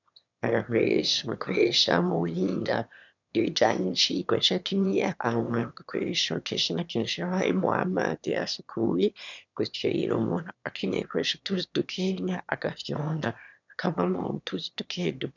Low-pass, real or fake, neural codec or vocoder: 7.2 kHz; fake; autoencoder, 22.05 kHz, a latent of 192 numbers a frame, VITS, trained on one speaker